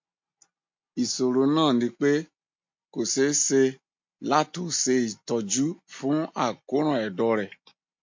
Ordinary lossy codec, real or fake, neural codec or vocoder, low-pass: MP3, 48 kbps; real; none; 7.2 kHz